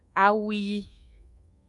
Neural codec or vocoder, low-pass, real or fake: codec, 24 kHz, 1.2 kbps, DualCodec; 10.8 kHz; fake